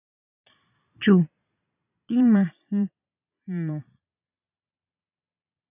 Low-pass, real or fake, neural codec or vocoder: 3.6 kHz; fake; vocoder, 22.05 kHz, 80 mel bands, Vocos